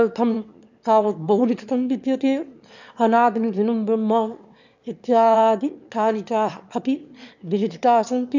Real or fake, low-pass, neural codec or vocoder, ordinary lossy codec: fake; 7.2 kHz; autoencoder, 22.05 kHz, a latent of 192 numbers a frame, VITS, trained on one speaker; none